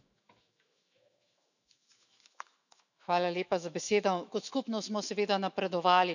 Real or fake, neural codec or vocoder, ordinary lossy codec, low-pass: fake; autoencoder, 48 kHz, 128 numbers a frame, DAC-VAE, trained on Japanese speech; none; 7.2 kHz